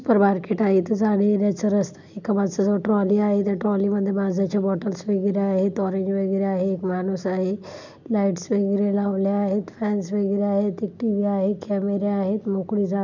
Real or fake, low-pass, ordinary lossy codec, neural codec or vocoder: real; 7.2 kHz; none; none